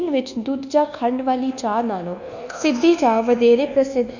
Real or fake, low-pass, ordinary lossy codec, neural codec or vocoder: fake; 7.2 kHz; none; codec, 24 kHz, 1.2 kbps, DualCodec